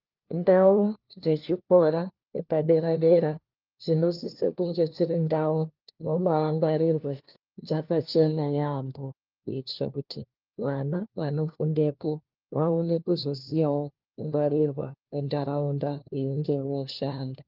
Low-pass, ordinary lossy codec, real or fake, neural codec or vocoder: 5.4 kHz; Opus, 32 kbps; fake; codec, 16 kHz, 1 kbps, FunCodec, trained on LibriTTS, 50 frames a second